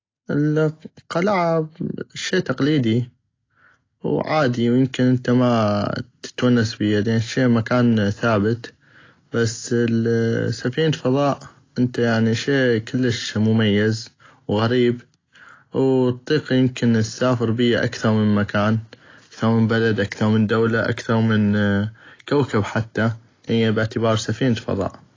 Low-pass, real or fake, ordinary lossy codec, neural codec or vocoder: 7.2 kHz; real; AAC, 32 kbps; none